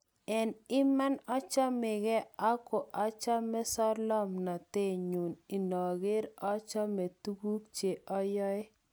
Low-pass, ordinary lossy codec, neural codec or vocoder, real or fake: none; none; none; real